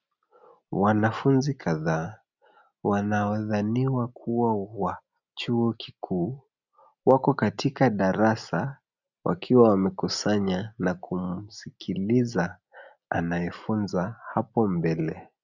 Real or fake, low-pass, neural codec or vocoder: real; 7.2 kHz; none